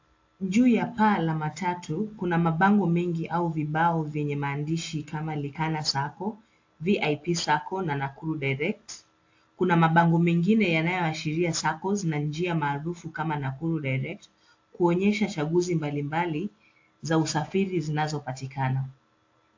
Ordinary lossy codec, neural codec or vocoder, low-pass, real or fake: AAC, 48 kbps; none; 7.2 kHz; real